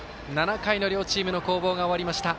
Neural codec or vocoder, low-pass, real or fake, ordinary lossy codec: none; none; real; none